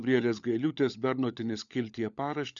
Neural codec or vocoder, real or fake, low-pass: codec, 16 kHz, 16 kbps, FunCodec, trained on LibriTTS, 50 frames a second; fake; 7.2 kHz